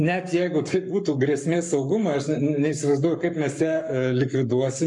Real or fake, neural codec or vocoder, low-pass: fake; codec, 44.1 kHz, 7.8 kbps, Pupu-Codec; 10.8 kHz